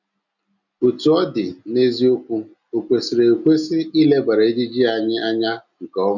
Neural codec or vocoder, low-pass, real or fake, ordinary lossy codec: none; 7.2 kHz; real; none